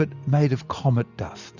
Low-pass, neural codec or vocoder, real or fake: 7.2 kHz; none; real